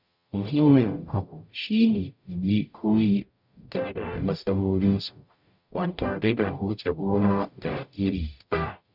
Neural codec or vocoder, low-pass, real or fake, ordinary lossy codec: codec, 44.1 kHz, 0.9 kbps, DAC; 5.4 kHz; fake; none